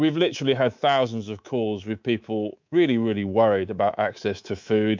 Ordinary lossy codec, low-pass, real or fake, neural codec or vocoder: MP3, 64 kbps; 7.2 kHz; fake; codec, 24 kHz, 3.1 kbps, DualCodec